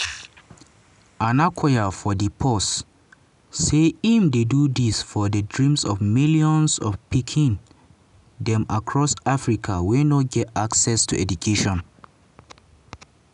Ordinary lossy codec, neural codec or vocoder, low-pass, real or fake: none; none; 10.8 kHz; real